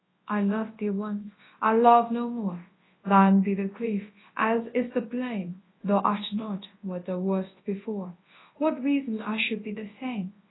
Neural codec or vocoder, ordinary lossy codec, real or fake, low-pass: codec, 24 kHz, 0.9 kbps, WavTokenizer, large speech release; AAC, 16 kbps; fake; 7.2 kHz